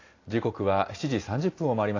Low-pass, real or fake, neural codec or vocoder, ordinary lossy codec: 7.2 kHz; real; none; AAC, 32 kbps